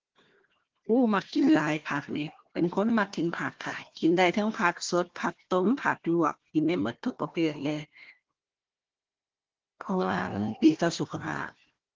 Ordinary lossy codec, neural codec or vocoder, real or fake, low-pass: Opus, 16 kbps; codec, 16 kHz, 1 kbps, FunCodec, trained on Chinese and English, 50 frames a second; fake; 7.2 kHz